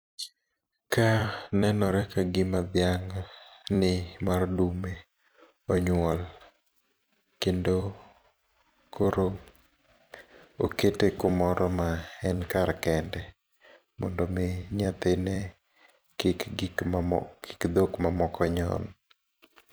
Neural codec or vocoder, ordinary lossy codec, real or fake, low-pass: vocoder, 44.1 kHz, 128 mel bands every 256 samples, BigVGAN v2; none; fake; none